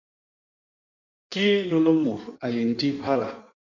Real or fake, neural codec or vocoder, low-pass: fake; codec, 16 kHz in and 24 kHz out, 1.1 kbps, FireRedTTS-2 codec; 7.2 kHz